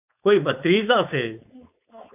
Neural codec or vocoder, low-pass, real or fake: codec, 16 kHz, 4.8 kbps, FACodec; 3.6 kHz; fake